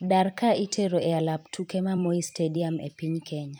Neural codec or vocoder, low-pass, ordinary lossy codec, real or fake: vocoder, 44.1 kHz, 128 mel bands every 256 samples, BigVGAN v2; none; none; fake